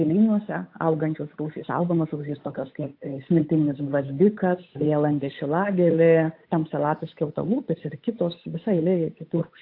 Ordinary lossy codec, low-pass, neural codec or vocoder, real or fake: AAC, 32 kbps; 5.4 kHz; codec, 16 kHz, 8 kbps, FunCodec, trained on Chinese and English, 25 frames a second; fake